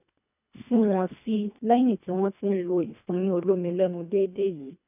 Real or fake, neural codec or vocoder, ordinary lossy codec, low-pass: fake; codec, 24 kHz, 1.5 kbps, HILCodec; none; 3.6 kHz